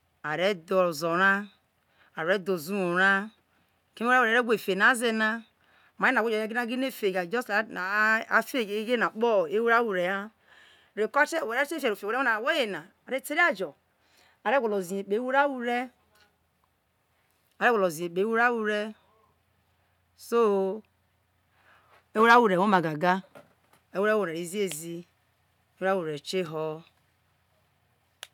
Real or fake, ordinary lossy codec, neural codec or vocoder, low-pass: real; none; none; 19.8 kHz